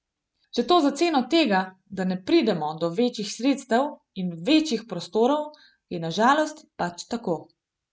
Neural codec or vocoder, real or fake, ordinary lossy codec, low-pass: none; real; none; none